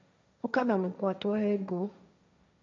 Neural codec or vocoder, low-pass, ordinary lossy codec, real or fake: codec, 16 kHz, 1.1 kbps, Voila-Tokenizer; 7.2 kHz; MP3, 48 kbps; fake